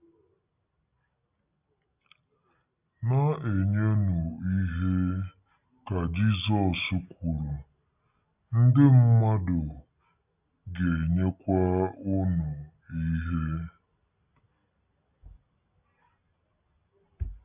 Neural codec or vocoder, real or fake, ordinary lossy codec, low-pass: none; real; none; 3.6 kHz